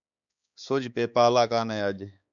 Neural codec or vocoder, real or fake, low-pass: codec, 16 kHz, 4 kbps, X-Codec, HuBERT features, trained on balanced general audio; fake; 7.2 kHz